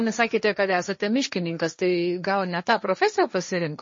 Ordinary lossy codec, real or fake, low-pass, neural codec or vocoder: MP3, 32 kbps; fake; 7.2 kHz; codec, 16 kHz, 1.1 kbps, Voila-Tokenizer